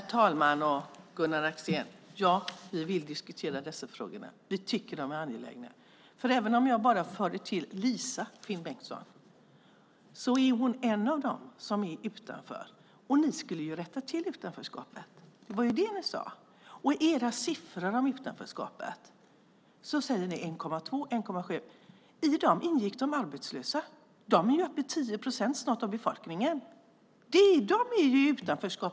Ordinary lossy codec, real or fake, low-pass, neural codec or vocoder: none; real; none; none